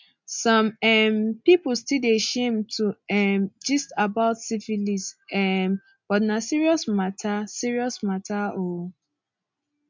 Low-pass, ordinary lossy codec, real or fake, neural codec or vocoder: 7.2 kHz; MP3, 64 kbps; real; none